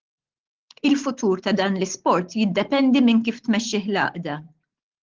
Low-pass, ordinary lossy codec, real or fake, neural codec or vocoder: 7.2 kHz; Opus, 16 kbps; real; none